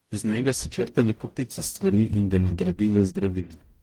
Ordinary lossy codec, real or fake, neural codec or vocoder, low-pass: Opus, 24 kbps; fake; codec, 44.1 kHz, 0.9 kbps, DAC; 19.8 kHz